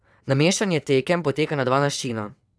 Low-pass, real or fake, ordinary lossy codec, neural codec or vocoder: none; fake; none; vocoder, 22.05 kHz, 80 mel bands, WaveNeXt